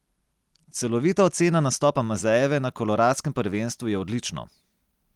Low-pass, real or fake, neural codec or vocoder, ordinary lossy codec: 19.8 kHz; real; none; Opus, 24 kbps